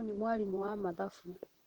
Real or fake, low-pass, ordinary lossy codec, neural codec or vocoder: fake; 19.8 kHz; Opus, 16 kbps; vocoder, 44.1 kHz, 128 mel bands, Pupu-Vocoder